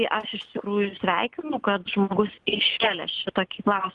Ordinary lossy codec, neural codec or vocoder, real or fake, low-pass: Opus, 24 kbps; none; real; 10.8 kHz